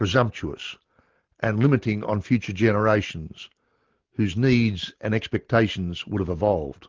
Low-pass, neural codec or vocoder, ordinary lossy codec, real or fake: 7.2 kHz; none; Opus, 16 kbps; real